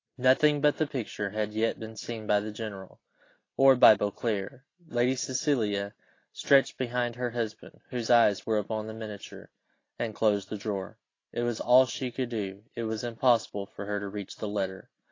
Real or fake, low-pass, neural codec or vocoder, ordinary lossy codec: real; 7.2 kHz; none; AAC, 32 kbps